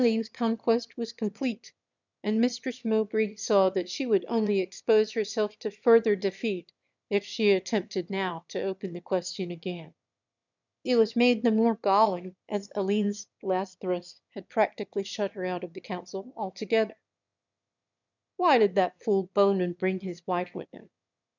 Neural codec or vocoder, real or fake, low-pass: autoencoder, 22.05 kHz, a latent of 192 numbers a frame, VITS, trained on one speaker; fake; 7.2 kHz